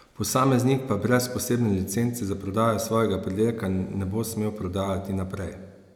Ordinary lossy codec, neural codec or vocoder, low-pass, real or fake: none; none; 19.8 kHz; real